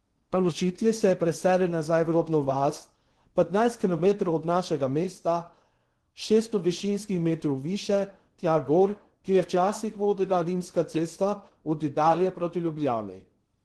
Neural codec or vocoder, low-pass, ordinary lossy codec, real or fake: codec, 16 kHz in and 24 kHz out, 0.6 kbps, FocalCodec, streaming, 2048 codes; 10.8 kHz; Opus, 16 kbps; fake